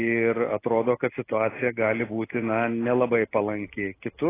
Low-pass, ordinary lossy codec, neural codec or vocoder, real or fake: 3.6 kHz; AAC, 16 kbps; none; real